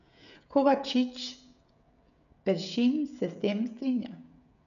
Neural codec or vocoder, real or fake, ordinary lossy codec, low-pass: codec, 16 kHz, 16 kbps, FreqCodec, smaller model; fake; none; 7.2 kHz